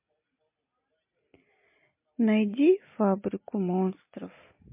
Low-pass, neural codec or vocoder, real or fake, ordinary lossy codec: 3.6 kHz; none; real; MP3, 24 kbps